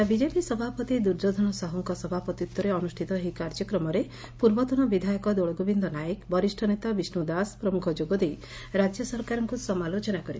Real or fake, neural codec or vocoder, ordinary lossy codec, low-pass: real; none; none; none